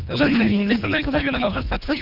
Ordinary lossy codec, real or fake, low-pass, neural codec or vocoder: none; fake; 5.4 kHz; codec, 24 kHz, 1.5 kbps, HILCodec